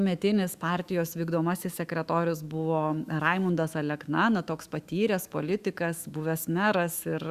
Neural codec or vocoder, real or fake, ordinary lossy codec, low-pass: autoencoder, 48 kHz, 128 numbers a frame, DAC-VAE, trained on Japanese speech; fake; Opus, 64 kbps; 14.4 kHz